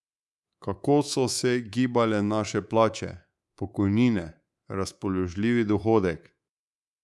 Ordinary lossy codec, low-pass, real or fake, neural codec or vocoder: none; none; fake; codec, 24 kHz, 3.1 kbps, DualCodec